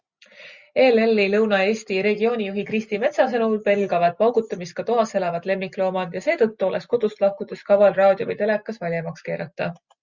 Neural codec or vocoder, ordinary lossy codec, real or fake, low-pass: none; Opus, 64 kbps; real; 7.2 kHz